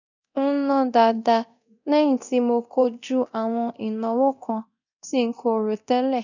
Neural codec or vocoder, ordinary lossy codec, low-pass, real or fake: codec, 24 kHz, 0.9 kbps, DualCodec; none; 7.2 kHz; fake